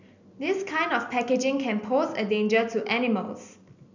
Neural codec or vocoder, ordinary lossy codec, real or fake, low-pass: none; none; real; 7.2 kHz